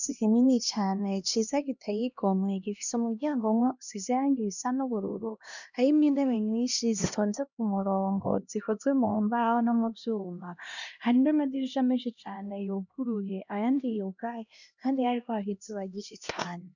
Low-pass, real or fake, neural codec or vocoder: 7.2 kHz; fake; codec, 16 kHz, 1 kbps, X-Codec, HuBERT features, trained on LibriSpeech